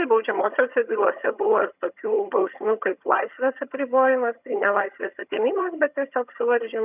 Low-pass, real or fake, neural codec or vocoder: 3.6 kHz; fake; vocoder, 22.05 kHz, 80 mel bands, HiFi-GAN